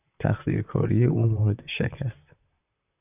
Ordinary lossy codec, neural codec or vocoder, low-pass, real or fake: AAC, 32 kbps; vocoder, 44.1 kHz, 128 mel bands, Pupu-Vocoder; 3.6 kHz; fake